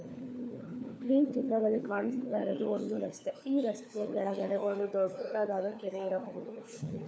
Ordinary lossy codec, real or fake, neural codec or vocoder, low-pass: none; fake; codec, 16 kHz, 4 kbps, FunCodec, trained on LibriTTS, 50 frames a second; none